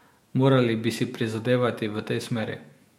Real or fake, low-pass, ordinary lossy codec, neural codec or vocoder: fake; 19.8 kHz; MP3, 64 kbps; autoencoder, 48 kHz, 128 numbers a frame, DAC-VAE, trained on Japanese speech